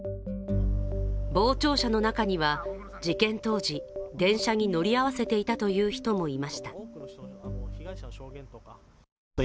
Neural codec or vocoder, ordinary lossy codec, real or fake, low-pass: none; none; real; none